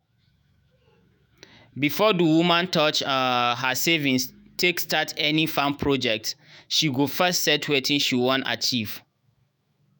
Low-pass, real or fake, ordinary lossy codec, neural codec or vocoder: none; fake; none; autoencoder, 48 kHz, 128 numbers a frame, DAC-VAE, trained on Japanese speech